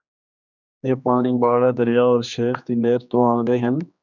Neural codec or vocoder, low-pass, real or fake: codec, 16 kHz, 2 kbps, X-Codec, HuBERT features, trained on general audio; 7.2 kHz; fake